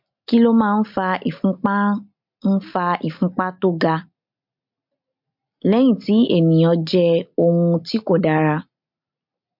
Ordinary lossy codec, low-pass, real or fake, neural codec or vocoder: MP3, 48 kbps; 5.4 kHz; real; none